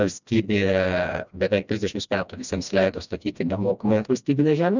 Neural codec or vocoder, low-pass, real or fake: codec, 16 kHz, 1 kbps, FreqCodec, smaller model; 7.2 kHz; fake